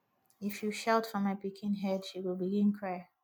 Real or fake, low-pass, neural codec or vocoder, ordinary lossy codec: real; none; none; none